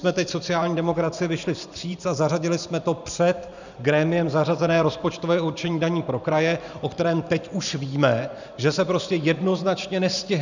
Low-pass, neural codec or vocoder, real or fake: 7.2 kHz; vocoder, 44.1 kHz, 128 mel bands every 512 samples, BigVGAN v2; fake